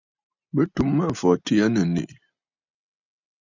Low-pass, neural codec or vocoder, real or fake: 7.2 kHz; none; real